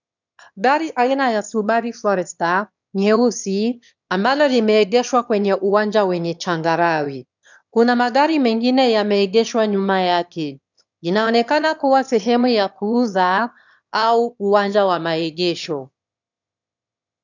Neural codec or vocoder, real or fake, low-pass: autoencoder, 22.05 kHz, a latent of 192 numbers a frame, VITS, trained on one speaker; fake; 7.2 kHz